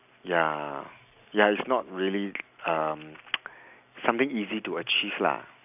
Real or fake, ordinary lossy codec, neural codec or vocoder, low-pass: real; none; none; 3.6 kHz